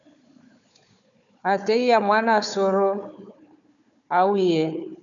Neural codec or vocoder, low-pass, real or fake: codec, 16 kHz, 4 kbps, FunCodec, trained on Chinese and English, 50 frames a second; 7.2 kHz; fake